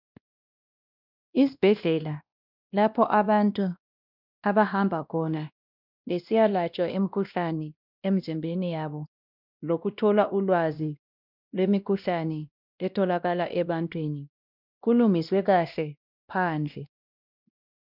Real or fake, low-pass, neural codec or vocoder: fake; 5.4 kHz; codec, 16 kHz, 1 kbps, X-Codec, WavLM features, trained on Multilingual LibriSpeech